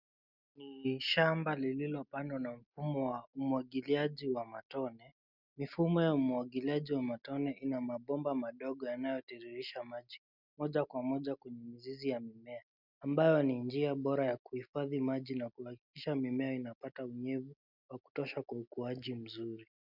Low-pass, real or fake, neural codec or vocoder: 5.4 kHz; real; none